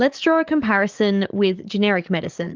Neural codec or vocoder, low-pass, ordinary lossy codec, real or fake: codec, 44.1 kHz, 7.8 kbps, DAC; 7.2 kHz; Opus, 24 kbps; fake